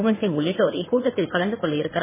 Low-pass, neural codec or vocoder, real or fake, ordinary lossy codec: 3.6 kHz; codec, 16 kHz in and 24 kHz out, 2.2 kbps, FireRedTTS-2 codec; fake; MP3, 16 kbps